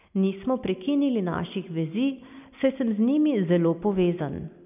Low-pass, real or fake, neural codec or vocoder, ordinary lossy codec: 3.6 kHz; real; none; none